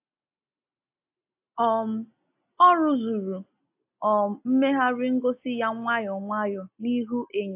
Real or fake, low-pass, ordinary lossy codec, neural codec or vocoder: real; 3.6 kHz; AAC, 32 kbps; none